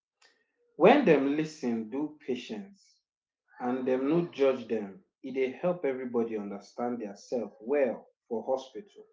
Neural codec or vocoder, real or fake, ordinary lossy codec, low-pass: none; real; none; none